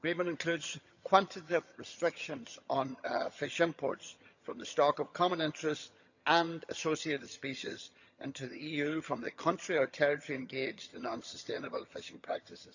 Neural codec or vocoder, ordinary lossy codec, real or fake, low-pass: vocoder, 22.05 kHz, 80 mel bands, HiFi-GAN; none; fake; 7.2 kHz